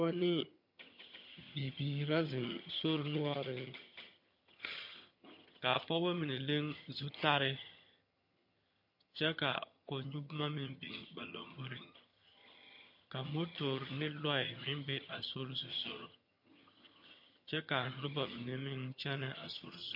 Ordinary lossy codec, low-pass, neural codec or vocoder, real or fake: MP3, 32 kbps; 5.4 kHz; vocoder, 22.05 kHz, 80 mel bands, HiFi-GAN; fake